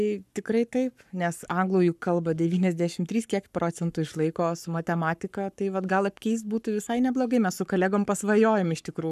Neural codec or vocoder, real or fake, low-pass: codec, 44.1 kHz, 7.8 kbps, Pupu-Codec; fake; 14.4 kHz